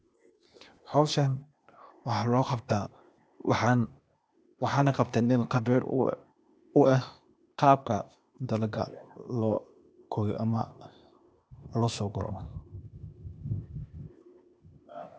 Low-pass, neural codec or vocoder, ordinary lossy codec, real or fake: none; codec, 16 kHz, 0.8 kbps, ZipCodec; none; fake